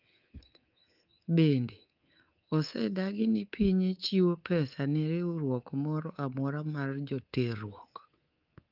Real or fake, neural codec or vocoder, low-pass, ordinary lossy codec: fake; codec, 24 kHz, 3.1 kbps, DualCodec; 5.4 kHz; Opus, 32 kbps